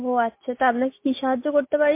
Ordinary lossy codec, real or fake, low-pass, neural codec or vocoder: MP3, 24 kbps; real; 3.6 kHz; none